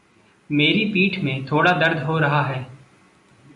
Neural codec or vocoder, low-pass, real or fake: none; 10.8 kHz; real